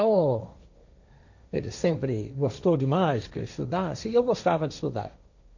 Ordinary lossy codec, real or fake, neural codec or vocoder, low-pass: none; fake; codec, 16 kHz, 1.1 kbps, Voila-Tokenizer; 7.2 kHz